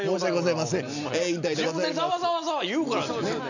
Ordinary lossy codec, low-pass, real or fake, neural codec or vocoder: none; 7.2 kHz; real; none